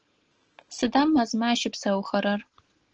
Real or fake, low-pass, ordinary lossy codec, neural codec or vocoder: real; 7.2 kHz; Opus, 16 kbps; none